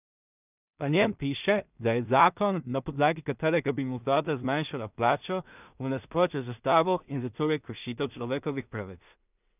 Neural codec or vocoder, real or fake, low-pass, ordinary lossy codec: codec, 16 kHz in and 24 kHz out, 0.4 kbps, LongCat-Audio-Codec, two codebook decoder; fake; 3.6 kHz; none